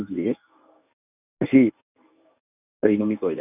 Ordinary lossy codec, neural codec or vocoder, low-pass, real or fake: none; codec, 24 kHz, 0.9 kbps, WavTokenizer, medium speech release version 1; 3.6 kHz; fake